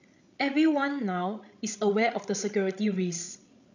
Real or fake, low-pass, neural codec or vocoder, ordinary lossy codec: fake; 7.2 kHz; codec, 16 kHz, 16 kbps, FreqCodec, larger model; none